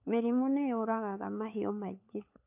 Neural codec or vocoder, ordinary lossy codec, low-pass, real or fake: codec, 16 kHz, 4 kbps, FunCodec, trained on LibriTTS, 50 frames a second; AAC, 32 kbps; 3.6 kHz; fake